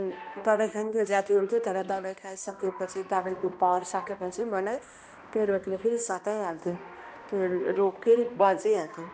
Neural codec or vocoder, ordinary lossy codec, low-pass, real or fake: codec, 16 kHz, 1 kbps, X-Codec, HuBERT features, trained on balanced general audio; none; none; fake